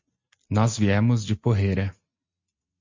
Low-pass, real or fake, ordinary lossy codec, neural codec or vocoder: 7.2 kHz; real; MP3, 48 kbps; none